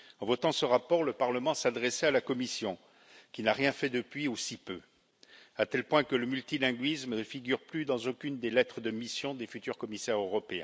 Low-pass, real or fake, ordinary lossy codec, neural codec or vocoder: none; real; none; none